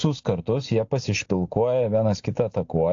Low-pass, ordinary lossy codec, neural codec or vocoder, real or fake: 7.2 kHz; AAC, 48 kbps; none; real